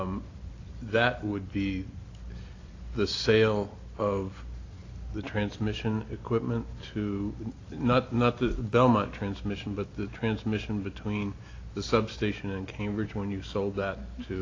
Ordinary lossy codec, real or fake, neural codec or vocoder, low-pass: AAC, 32 kbps; real; none; 7.2 kHz